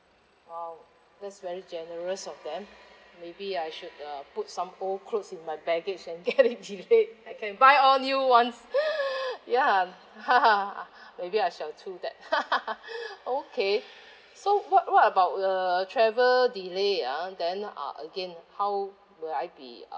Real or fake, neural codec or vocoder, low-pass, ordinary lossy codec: real; none; none; none